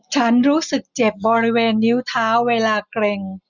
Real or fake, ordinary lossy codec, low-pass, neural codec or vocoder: real; none; 7.2 kHz; none